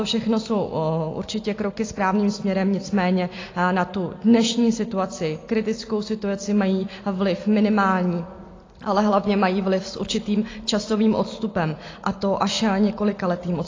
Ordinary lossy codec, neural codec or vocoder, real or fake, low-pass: AAC, 32 kbps; none; real; 7.2 kHz